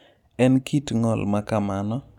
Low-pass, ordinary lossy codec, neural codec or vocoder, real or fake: 19.8 kHz; none; none; real